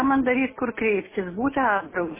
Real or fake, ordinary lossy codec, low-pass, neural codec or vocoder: real; MP3, 16 kbps; 3.6 kHz; none